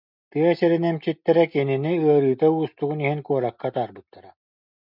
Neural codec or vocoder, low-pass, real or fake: none; 5.4 kHz; real